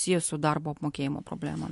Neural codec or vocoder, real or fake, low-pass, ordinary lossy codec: none; real; 14.4 kHz; MP3, 48 kbps